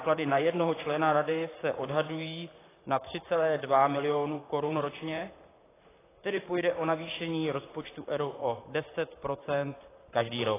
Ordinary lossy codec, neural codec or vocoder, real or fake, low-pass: AAC, 16 kbps; vocoder, 44.1 kHz, 128 mel bands, Pupu-Vocoder; fake; 3.6 kHz